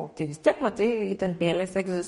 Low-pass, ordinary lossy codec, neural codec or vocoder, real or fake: 19.8 kHz; MP3, 48 kbps; codec, 44.1 kHz, 2.6 kbps, DAC; fake